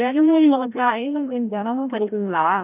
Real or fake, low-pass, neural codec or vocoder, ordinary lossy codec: fake; 3.6 kHz; codec, 16 kHz, 0.5 kbps, FreqCodec, larger model; none